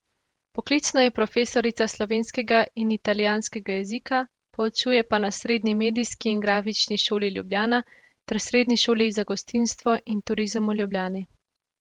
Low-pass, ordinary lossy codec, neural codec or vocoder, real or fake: 19.8 kHz; Opus, 24 kbps; vocoder, 48 kHz, 128 mel bands, Vocos; fake